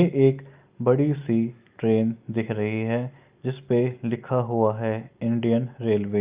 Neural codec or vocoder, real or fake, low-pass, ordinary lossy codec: none; real; 3.6 kHz; Opus, 24 kbps